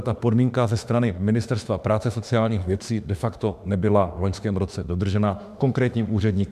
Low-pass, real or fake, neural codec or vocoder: 14.4 kHz; fake; autoencoder, 48 kHz, 32 numbers a frame, DAC-VAE, trained on Japanese speech